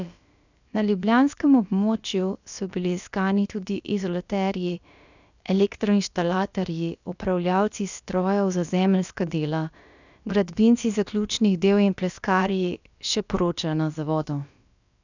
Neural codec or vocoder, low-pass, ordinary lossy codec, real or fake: codec, 16 kHz, about 1 kbps, DyCAST, with the encoder's durations; 7.2 kHz; none; fake